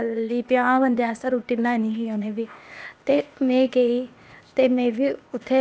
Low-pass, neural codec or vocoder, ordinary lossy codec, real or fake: none; codec, 16 kHz, 0.8 kbps, ZipCodec; none; fake